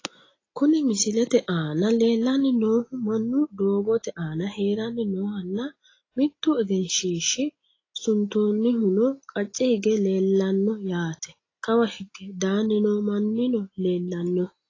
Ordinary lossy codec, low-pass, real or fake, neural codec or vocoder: AAC, 32 kbps; 7.2 kHz; real; none